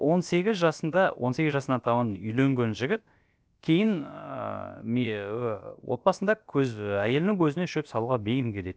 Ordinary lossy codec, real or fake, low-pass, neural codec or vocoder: none; fake; none; codec, 16 kHz, about 1 kbps, DyCAST, with the encoder's durations